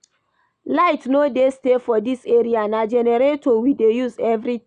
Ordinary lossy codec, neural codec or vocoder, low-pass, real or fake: none; none; 9.9 kHz; real